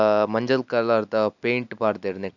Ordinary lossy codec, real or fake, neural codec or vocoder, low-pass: none; real; none; 7.2 kHz